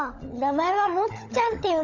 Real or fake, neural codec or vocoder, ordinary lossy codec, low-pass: fake; codec, 16 kHz, 16 kbps, FunCodec, trained on LibriTTS, 50 frames a second; none; 7.2 kHz